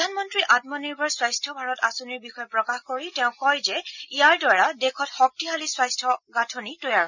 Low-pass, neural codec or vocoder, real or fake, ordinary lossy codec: 7.2 kHz; none; real; none